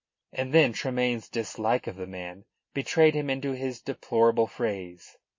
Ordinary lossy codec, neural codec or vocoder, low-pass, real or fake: MP3, 32 kbps; none; 7.2 kHz; real